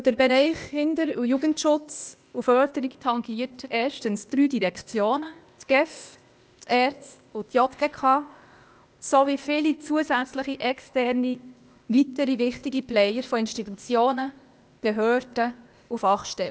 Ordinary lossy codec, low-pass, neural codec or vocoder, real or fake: none; none; codec, 16 kHz, 0.8 kbps, ZipCodec; fake